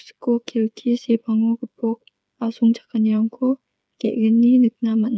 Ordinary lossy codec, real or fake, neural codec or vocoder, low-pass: none; fake; codec, 16 kHz, 8 kbps, FreqCodec, smaller model; none